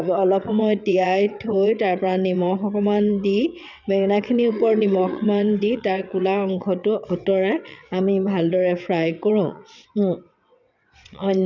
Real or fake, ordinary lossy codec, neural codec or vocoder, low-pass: fake; none; vocoder, 22.05 kHz, 80 mel bands, WaveNeXt; 7.2 kHz